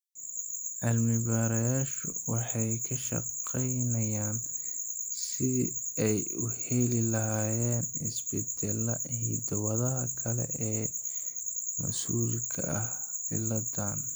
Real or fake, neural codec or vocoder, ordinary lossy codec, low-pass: real; none; none; none